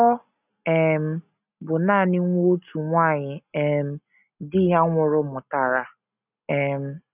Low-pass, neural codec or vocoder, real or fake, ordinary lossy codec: 3.6 kHz; none; real; none